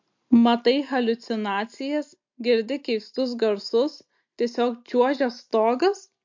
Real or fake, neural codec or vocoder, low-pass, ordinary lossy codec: real; none; 7.2 kHz; MP3, 48 kbps